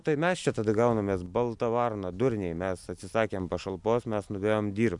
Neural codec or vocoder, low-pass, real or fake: none; 10.8 kHz; real